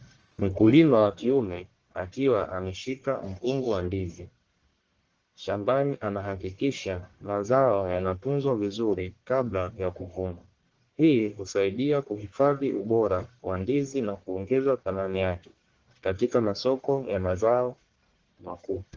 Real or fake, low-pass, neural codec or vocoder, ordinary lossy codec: fake; 7.2 kHz; codec, 44.1 kHz, 1.7 kbps, Pupu-Codec; Opus, 16 kbps